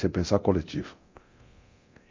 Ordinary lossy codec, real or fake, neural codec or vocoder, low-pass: MP3, 48 kbps; fake; codec, 24 kHz, 0.9 kbps, DualCodec; 7.2 kHz